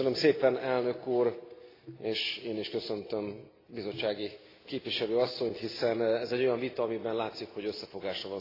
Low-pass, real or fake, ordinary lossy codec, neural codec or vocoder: 5.4 kHz; real; AAC, 24 kbps; none